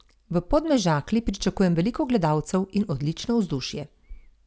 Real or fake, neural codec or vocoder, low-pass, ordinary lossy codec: real; none; none; none